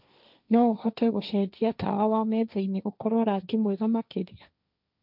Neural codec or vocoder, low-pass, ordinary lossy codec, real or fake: codec, 16 kHz, 1.1 kbps, Voila-Tokenizer; 5.4 kHz; none; fake